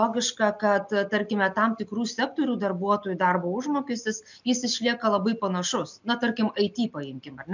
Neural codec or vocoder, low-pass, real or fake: none; 7.2 kHz; real